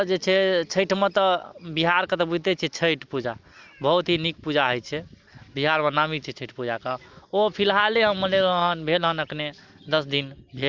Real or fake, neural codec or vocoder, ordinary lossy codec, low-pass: real; none; Opus, 24 kbps; 7.2 kHz